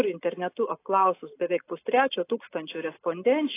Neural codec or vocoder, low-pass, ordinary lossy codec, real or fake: none; 3.6 kHz; AAC, 24 kbps; real